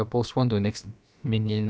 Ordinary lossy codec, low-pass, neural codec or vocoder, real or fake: none; none; codec, 16 kHz, about 1 kbps, DyCAST, with the encoder's durations; fake